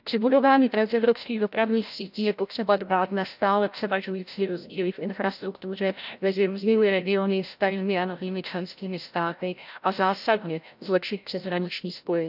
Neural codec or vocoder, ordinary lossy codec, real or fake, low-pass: codec, 16 kHz, 0.5 kbps, FreqCodec, larger model; none; fake; 5.4 kHz